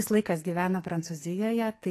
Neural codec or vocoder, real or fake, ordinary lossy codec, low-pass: codec, 44.1 kHz, 2.6 kbps, SNAC; fake; AAC, 48 kbps; 14.4 kHz